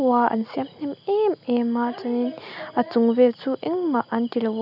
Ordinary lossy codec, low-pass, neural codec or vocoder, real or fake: none; 5.4 kHz; none; real